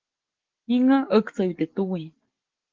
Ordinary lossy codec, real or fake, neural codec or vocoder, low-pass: Opus, 16 kbps; real; none; 7.2 kHz